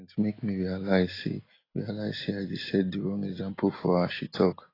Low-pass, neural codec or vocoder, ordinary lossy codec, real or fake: 5.4 kHz; none; AAC, 24 kbps; real